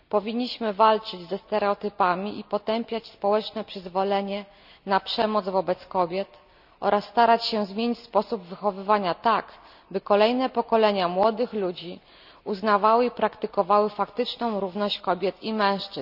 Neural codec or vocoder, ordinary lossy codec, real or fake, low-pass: none; none; real; 5.4 kHz